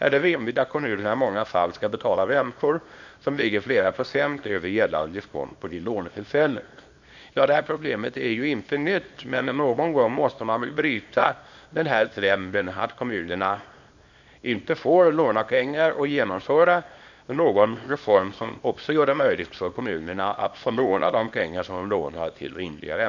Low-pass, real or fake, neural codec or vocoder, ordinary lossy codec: 7.2 kHz; fake; codec, 24 kHz, 0.9 kbps, WavTokenizer, small release; none